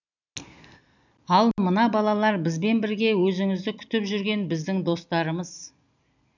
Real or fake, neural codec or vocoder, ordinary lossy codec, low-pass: real; none; none; 7.2 kHz